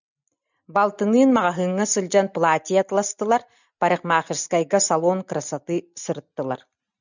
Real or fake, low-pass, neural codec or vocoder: real; 7.2 kHz; none